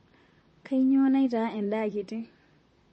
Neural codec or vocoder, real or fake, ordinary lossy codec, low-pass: vocoder, 44.1 kHz, 128 mel bands, Pupu-Vocoder; fake; MP3, 32 kbps; 10.8 kHz